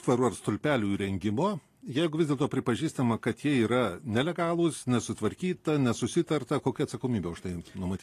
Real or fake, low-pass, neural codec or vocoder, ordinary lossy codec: real; 14.4 kHz; none; AAC, 48 kbps